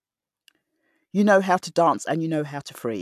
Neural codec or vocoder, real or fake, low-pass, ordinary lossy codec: none; real; 14.4 kHz; AAC, 96 kbps